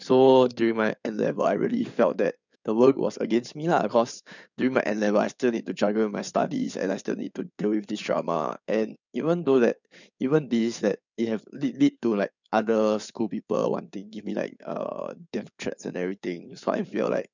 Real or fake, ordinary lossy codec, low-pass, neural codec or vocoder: fake; none; 7.2 kHz; codec, 16 kHz in and 24 kHz out, 2.2 kbps, FireRedTTS-2 codec